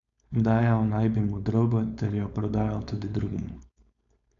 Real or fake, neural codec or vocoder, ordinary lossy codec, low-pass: fake; codec, 16 kHz, 4.8 kbps, FACodec; none; 7.2 kHz